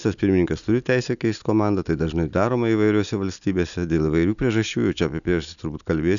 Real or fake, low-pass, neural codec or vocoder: real; 7.2 kHz; none